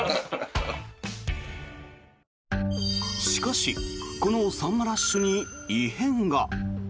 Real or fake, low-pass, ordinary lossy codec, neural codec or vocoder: real; none; none; none